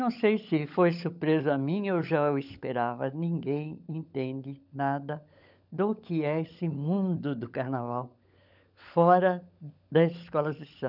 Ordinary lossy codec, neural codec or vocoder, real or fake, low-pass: none; codec, 16 kHz, 16 kbps, FunCodec, trained on LibriTTS, 50 frames a second; fake; 5.4 kHz